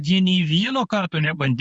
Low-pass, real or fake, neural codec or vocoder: 10.8 kHz; fake; codec, 24 kHz, 0.9 kbps, WavTokenizer, medium speech release version 1